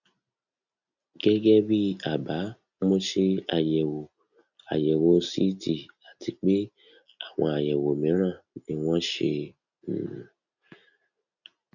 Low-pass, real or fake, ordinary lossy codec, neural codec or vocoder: 7.2 kHz; real; none; none